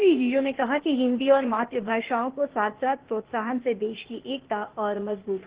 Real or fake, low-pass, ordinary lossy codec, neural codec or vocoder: fake; 3.6 kHz; Opus, 16 kbps; codec, 16 kHz, 0.8 kbps, ZipCodec